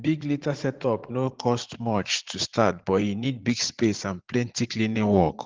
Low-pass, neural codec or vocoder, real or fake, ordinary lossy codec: 7.2 kHz; vocoder, 44.1 kHz, 80 mel bands, Vocos; fake; Opus, 16 kbps